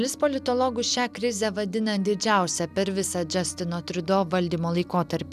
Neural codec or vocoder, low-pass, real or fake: none; 14.4 kHz; real